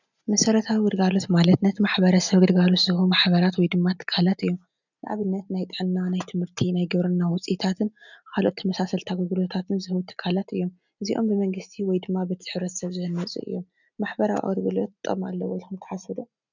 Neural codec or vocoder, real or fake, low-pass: none; real; 7.2 kHz